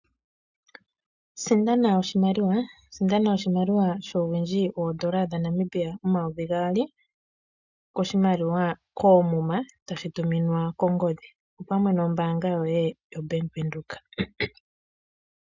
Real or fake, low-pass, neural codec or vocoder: real; 7.2 kHz; none